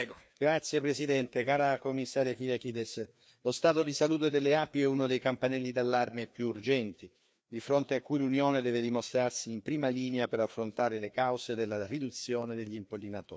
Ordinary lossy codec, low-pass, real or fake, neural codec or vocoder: none; none; fake; codec, 16 kHz, 2 kbps, FreqCodec, larger model